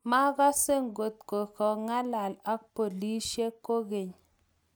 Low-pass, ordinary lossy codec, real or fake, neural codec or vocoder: none; none; real; none